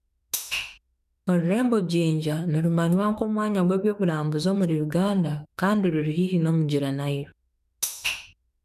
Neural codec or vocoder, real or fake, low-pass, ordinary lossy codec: autoencoder, 48 kHz, 32 numbers a frame, DAC-VAE, trained on Japanese speech; fake; 14.4 kHz; none